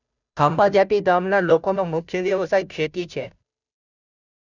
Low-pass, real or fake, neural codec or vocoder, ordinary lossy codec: 7.2 kHz; fake; codec, 16 kHz, 0.5 kbps, FunCodec, trained on Chinese and English, 25 frames a second; none